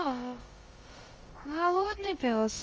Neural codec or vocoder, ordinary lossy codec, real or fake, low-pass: codec, 16 kHz, about 1 kbps, DyCAST, with the encoder's durations; Opus, 24 kbps; fake; 7.2 kHz